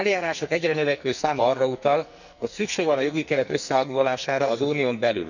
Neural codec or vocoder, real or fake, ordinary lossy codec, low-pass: codec, 44.1 kHz, 2.6 kbps, SNAC; fake; none; 7.2 kHz